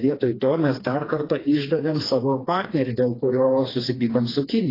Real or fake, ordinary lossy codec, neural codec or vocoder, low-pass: fake; AAC, 24 kbps; codec, 16 kHz, 2 kbps, FreqCodec, smaller model; 5.4 kHz